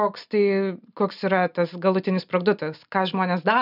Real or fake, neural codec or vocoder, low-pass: real; none; 5.4 kHz